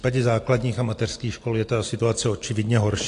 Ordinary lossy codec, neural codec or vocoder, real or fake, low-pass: AAC, 48 kbps; none; real; 10.8 kHz